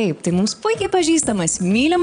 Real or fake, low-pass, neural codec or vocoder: fake; 9.9 kHz; vocoder, 22.05 kHz, 80 mel bands, Vocos